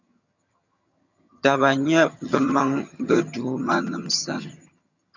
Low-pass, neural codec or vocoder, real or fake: 7.2 kHz; vocoder, 22.05 kHz, 80 mel bands, HiFi-GAN; fake